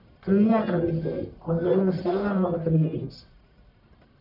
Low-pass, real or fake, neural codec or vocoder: 5.4 kHz; fake; codec, 44.1 kHz, 1.7 kbps, Pupu-Codec